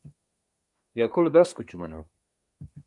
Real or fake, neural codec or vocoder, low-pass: fake; codec, 24 kHz, 1 kbps, SNAC; 10.8 kHz